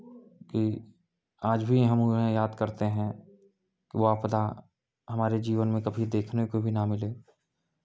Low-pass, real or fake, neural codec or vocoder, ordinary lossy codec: none; real; none; none